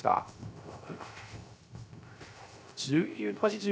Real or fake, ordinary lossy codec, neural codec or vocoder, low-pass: fake; none; codec, 16 kHz, 0.3 kbps, FocalCodec; none